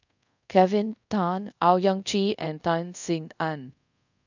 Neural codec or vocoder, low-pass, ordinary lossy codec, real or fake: codec, 24 kHz, 0.5 kbps, DualCodec; 7.2 kHz; none; fake